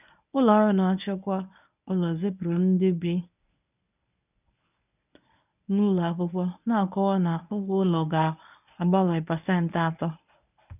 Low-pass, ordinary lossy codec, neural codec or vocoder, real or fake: 3.6 kHz; none; codec, 24 kHz, 0.9 kbps, WavTokenizer, medium speech release version 1; fake